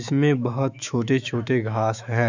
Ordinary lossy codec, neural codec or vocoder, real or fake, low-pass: none; autoencoder, 48 kHz, 128 numbers a frame, DAC-VAE, trained on Japanese speech; fake; 7.2 kHz